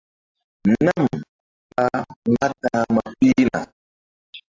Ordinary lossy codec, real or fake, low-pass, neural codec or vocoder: AAC, 32 kbps; real; 7.2 kHz; none